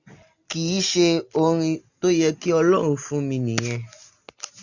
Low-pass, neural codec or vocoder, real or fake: 7.2 kHz; none; real